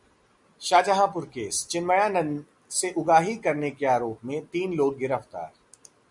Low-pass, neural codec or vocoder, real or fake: 10.8 kHz; none; real